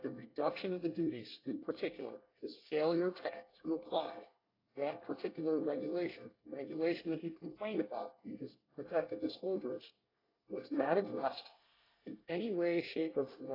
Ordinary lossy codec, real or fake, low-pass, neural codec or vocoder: AAC, 24 kbps; fake; 5.4 kHz; codec, 24 kHz, 1 kbps, SNAC